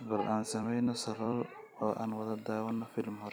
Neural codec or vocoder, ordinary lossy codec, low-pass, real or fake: vocoder, 44.1 kHz, 128 mel bands every 256 samples, BigVGAN v2; none; none; fake